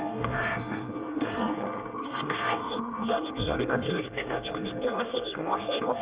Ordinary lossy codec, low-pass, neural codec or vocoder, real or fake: Opus, 24 kbps; 3.6 kHz; codec, 24 kHz, 1 kbps, SNAC; fake